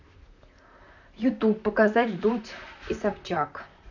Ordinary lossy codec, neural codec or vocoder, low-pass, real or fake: none; vocoder, 44.1 kHz, 128 mel bands, Pupu-Vocoder; 7.2 kHz; fake